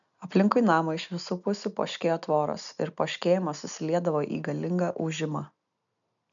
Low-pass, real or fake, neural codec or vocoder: 7.2 kHz; real; none